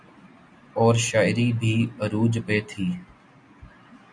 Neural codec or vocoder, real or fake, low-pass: none; real; 9.9 kHz